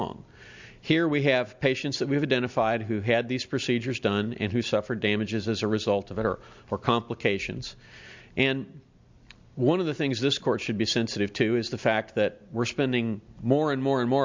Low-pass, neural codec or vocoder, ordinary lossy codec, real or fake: 7.2 kHz; none; MP3, 64 kbps; real